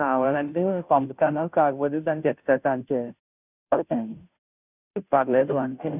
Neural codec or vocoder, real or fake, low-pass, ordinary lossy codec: codec, 16 kHz, 0.5 kbps, FunCodec, trained on Chinese and English, 25 frames a second; fake; 3.6 kHz; none